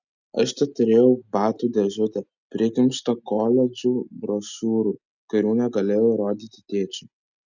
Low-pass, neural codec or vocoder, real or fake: 7.2 kHz; none; real